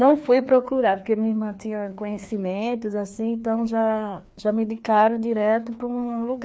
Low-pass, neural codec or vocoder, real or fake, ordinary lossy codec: none; codec, 16 kHz, 2 kbps, FreqCodec, larger model; fake; none